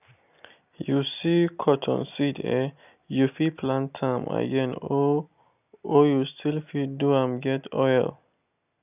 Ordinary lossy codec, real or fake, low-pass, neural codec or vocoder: none; real; 3.6 kHz; none